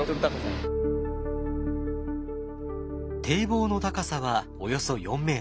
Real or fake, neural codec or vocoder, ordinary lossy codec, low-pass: real; none; none; none